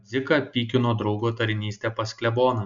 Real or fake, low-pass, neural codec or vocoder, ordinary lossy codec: real; 7.2 kHz; none; MP3, 96 kbps